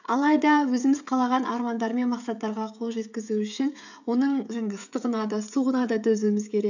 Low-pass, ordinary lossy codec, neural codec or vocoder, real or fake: 7.2 kHz; none; codec, 16 kHz, 16 kbps, FreqCodec, smaller model; fake